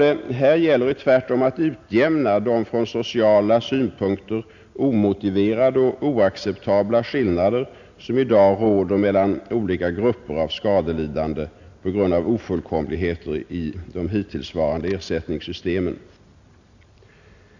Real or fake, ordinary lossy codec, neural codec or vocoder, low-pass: real; none; none; 7.2 kHz